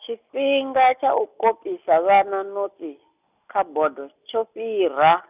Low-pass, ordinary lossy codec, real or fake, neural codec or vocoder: 3.6 kHz; none; real; none